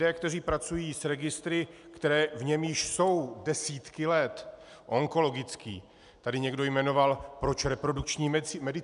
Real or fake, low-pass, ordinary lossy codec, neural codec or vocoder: real; 10.8 kHz; MP3, 96 kbps; none